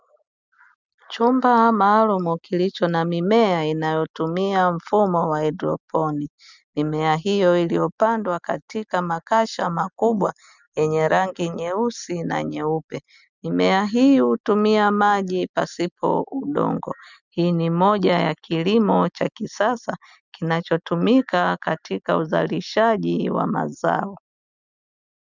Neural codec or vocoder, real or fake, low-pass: none; real; 7.2 kHz